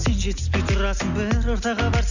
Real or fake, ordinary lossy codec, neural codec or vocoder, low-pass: real; none; none; 7.2 kHz